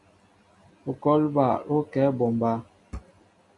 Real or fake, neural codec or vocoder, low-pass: real; none; 10.8 kHz